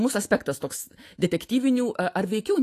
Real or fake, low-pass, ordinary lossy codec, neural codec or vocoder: fake; 14.4 kHz; AAC, 48 kbps; autoencoder, 48 kHz, 128 numbers a frame, DAC-VAE, trained on Japanese speech